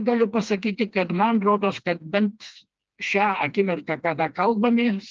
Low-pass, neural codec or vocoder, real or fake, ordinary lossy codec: 7.2 kHz; codec, 16 kHz, 2 kbps, FreqCodec, smaller model; fake; Opus, 24 kbps